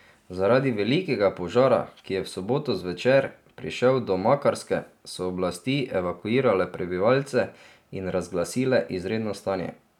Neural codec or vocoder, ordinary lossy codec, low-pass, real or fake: none; none; 19.8 kHz; real